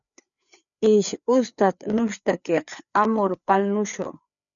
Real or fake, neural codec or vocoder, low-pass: fake; codec, 16 kHz, 4 kbps, FreqCodec, larger model; 7.2 kHz